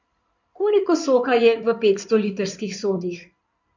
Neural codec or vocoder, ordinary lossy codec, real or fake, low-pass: codec, 16 kHz in and 24 kHz out, 2.2 kbps, FireRedTTS-2 codec; none; fake; 7.2 kHz